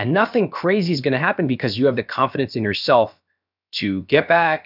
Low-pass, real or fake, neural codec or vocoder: 5.4 kHz; fake; codec, 16 kHz, about 1 kbps, DyCAST, with the encoder's durations